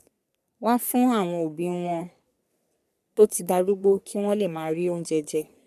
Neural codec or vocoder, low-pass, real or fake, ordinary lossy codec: codec, 44.1 kHz, 3.4 kbps, Pupu-Codec; 14.4 kHz; fake; none